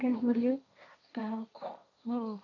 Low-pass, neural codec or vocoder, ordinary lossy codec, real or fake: 7.2 kHz; codec, 16 kHz, 1.1 kbps, Voila-Tokenizer; none; fake